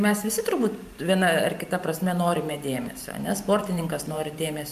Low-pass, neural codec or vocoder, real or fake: 14.4 kHz; vocoder, 44.1 kHz, 128 mel bands every 512 samples, BigVGAN v2; fake